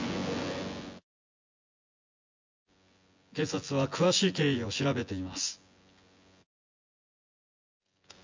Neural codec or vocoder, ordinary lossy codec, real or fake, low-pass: vocoder, 24 kHz, 100 mel bands, Vocos; MP3, 64 kbps; fake; 7.2 kHz